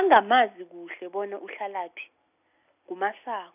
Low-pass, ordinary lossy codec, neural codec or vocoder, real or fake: 3.6 kHz; none; none; real